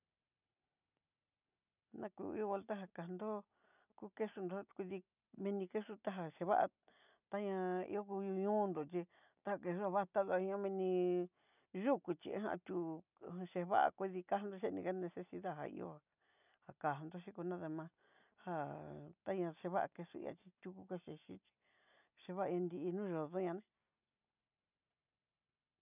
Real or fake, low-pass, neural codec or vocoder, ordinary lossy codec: real; 3.6 kHz; none; none